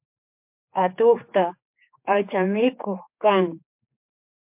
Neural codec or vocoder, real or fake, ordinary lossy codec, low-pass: codec, 16 kHz, 4 kbps, X-Codec, HuBERT features, trained on general audio; fake; MP3, 32 kbps; 3.6 kHz